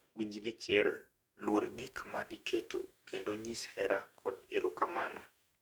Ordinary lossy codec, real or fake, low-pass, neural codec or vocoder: none; fake; none; codec, 44.1 kHz, 2.6 kbps, DAC